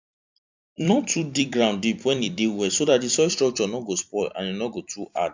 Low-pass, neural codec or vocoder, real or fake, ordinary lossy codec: 7.2 kHz; vocoder, 44.1 kHz, 128 mel bands every 256 samples, BigVGAN v2; fake; MP3, 64 kbps